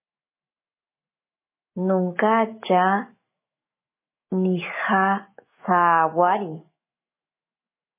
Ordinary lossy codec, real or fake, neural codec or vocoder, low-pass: MP3, 16 kbps; fake; autoencoder, 48 kHz, 128 numbers a frame, DAC-VAE, trained on Japanese speech; 3.6 kHz